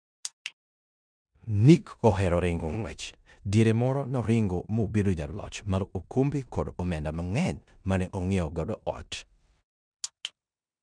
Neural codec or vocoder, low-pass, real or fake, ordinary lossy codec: codec, 16 kHz in and 24 kHz out, 0.9 kbps, LongCat-Audio-Codec, four codebook decoder; 9.9 kHz; fake; MP3, 96 kbps